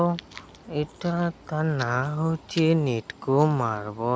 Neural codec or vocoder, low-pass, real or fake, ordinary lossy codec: none; none; real; none